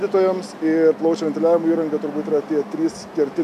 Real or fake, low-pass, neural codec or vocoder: real; 14.4 kHz; none